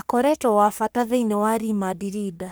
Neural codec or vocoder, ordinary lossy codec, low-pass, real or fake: codec, 44.1 kHz, 3.4 kbps, Pupu-Codec; none; none; fake